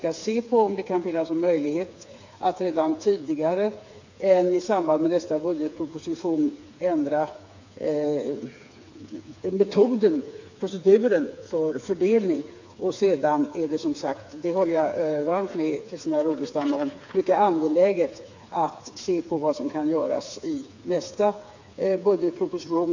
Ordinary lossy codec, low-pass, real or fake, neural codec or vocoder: AAC, 48 kbps; 7.2 kHz; fake; codec, 16 kHz, 4 kbps, FreqCodec, smaller model